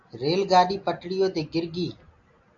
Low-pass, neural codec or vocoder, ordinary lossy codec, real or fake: 7.2 kHz; none; AAC, 48 kbps; real